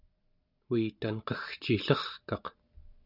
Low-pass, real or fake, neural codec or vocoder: 5.4 kHz; real; none